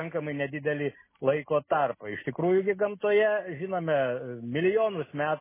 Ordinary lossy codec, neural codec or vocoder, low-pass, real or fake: MP3, 16 kbps; none; 3.6 kHz; real